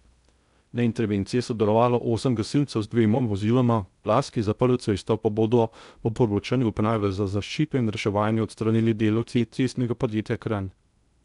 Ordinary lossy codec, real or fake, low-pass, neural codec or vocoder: none; fake; 10.8 kHz; codec, 16 kHz in and 24 kHz out, 0.6 kbps, FocalCodec, streaming, 2048 codes